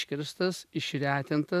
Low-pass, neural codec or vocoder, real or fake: 14.4 kHz; none; real